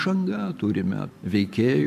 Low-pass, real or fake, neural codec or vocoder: 14.4 kHz; fake; vocoder, 44.1 kHz, 128 mel bands every 512 samples, BigVGAN v2